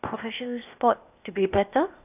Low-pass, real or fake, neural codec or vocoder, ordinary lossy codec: 3.6 kHz; fake; codec, 16 kHz, 0.8 kbps, ZipCodec; none